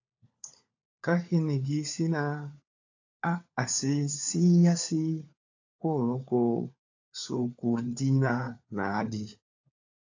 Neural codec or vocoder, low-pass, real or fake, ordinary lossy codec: codec, 16 kHz, 4 kbps, FunCodec, trained on LibriTTS, 50 frames a second; 7.2 kHz; fake; AAC, 48 kbps